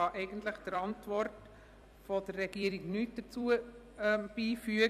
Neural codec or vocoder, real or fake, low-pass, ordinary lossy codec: vocoder, 44.1 kHz, 128 mel bands every 256 samples, BigVGAN v2; fake; 14.4 kHz; none